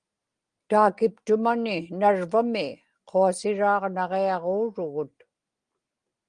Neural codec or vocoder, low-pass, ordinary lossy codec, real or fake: none; 9.9 kHz; Opus, 24 kbps; real